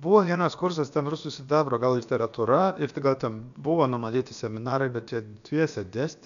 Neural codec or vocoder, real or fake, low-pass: codec, 16 kHz, about 1 kbps, DyCAST, with the encoder's durations; fake; 7.2 kHz